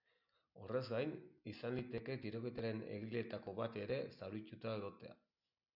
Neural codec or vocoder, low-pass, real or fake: none; 5.4 kHz; real